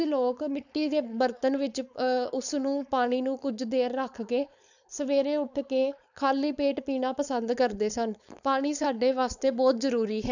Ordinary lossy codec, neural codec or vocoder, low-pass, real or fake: none; codec, 16 kHz, 4.8 kbps, FACodec; 7.2 kHz; fake